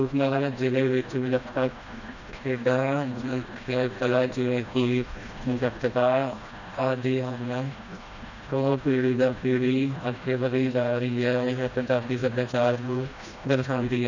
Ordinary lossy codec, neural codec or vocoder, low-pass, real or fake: none; codec, 16 kHz, 1 kbps, FreqCodec, smaller model; 7.2 kHz; fake